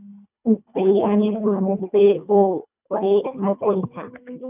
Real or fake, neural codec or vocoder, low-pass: fake; codec, 24 kHz, 1.5 kbps, HILCodec; 3.6 kHz